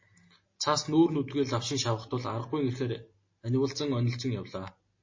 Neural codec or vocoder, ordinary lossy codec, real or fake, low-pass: none; MP3, 32 kbps; real; 7.2 kHz